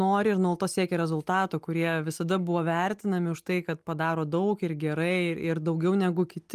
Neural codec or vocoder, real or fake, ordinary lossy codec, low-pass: none; real; Opus, 32 kbps; 14.4 kHz